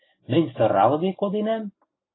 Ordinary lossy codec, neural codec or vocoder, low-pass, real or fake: AAC, 16 kbps; none; 7.2 kHz; real